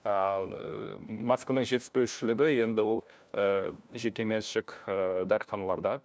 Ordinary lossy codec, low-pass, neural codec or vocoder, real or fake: none; none; codec, 16 kHz, 1 kbps, FunCodec, trained on LibriTTS, 50 frames a second; fake